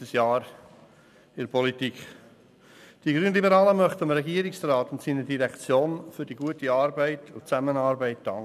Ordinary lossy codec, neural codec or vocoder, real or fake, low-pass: none; none; real; 14.4 kHz